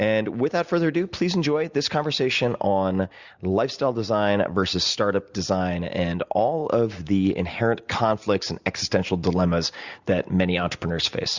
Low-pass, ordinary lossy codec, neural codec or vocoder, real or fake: 7.2 kHz; Opus, 64 kbps; none; real